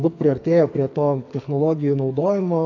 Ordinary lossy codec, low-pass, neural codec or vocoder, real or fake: AAC, 48 kbps; 7.2 kHz; codec, 44.1 kHz, 2.6 kbps, SNAC; fake